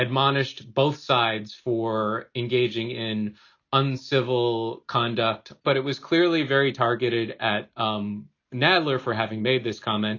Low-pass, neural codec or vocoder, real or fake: 7.2 kHz; none; real